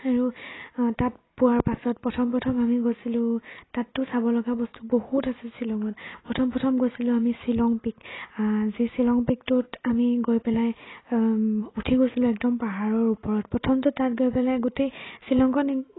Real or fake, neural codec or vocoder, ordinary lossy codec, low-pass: real; none; AAC, 16 kbps; 7.2 kHz